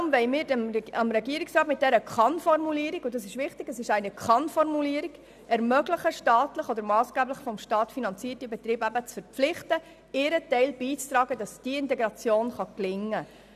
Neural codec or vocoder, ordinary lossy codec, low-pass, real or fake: none; none; 14.4 kHz; real